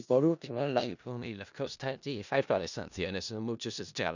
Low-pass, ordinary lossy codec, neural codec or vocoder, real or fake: 7.2 kHz; none; codec, 16 kHz in and 24 kHz out, 0.4 kbps, LongCat-Audio-Codec, four codebook decoder; fake